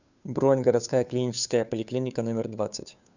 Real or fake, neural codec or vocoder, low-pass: fake; codec, 16 kHz, 2 kbps, FunCodec, trained on Chinese and English, 25 frames a second; 7.2 kHz